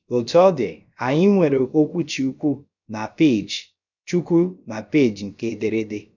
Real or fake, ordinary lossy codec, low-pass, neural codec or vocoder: fake; none; 7.2 kHz; codec, 16 kHz, about 1 kbps, DyCAST, with the encoder's durations